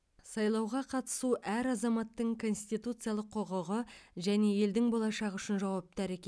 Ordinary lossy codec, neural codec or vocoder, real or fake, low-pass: none; none; real; none